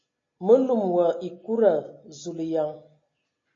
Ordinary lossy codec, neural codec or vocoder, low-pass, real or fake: AAC, 32 kbps; none; 7.2 kHz; real